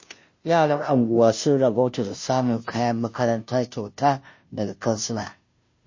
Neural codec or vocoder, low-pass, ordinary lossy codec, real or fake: codec, 16 kHz, 0.5 kbps, FunCodec, trained on Chinese and English, 25 frames a second; 7.2 kHz; MP3, 32 kbps; fake